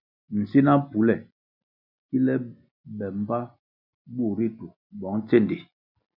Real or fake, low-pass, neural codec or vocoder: real; 5.4 kHz; none